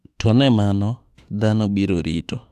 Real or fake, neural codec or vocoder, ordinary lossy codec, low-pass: fake; autoencoder, 48 kHz, 32 numbers a frame, DAC-VAE, trained on Japanese speech; AAC, 96 kbps; 14.4 kHz